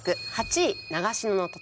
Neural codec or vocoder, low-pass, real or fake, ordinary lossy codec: none; none; real; none